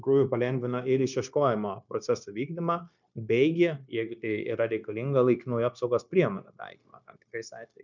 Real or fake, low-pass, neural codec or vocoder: fake; 7.2 kHz; codec, 16 kHz, 0.9 kbps, LongCat-Audio-Codec